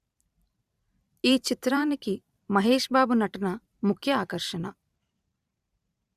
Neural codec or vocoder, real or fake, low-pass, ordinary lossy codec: vocoder, 44.1 kHz, 128 mel bands every 512 samples, BigVGAN v2; fake; 14.4 kHz; Opus, 64 kbps